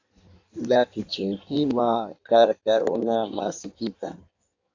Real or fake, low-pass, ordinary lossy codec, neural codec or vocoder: fake; 7.2 kHz; AAC, 48 kbps; codec, 16 kHz in and 24 kHz out, 1.1 kbps, FireRedTTS-2 codec